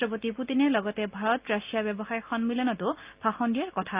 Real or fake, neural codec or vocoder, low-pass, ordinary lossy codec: real; none; 3.6 kHz; Opus, 24 kbps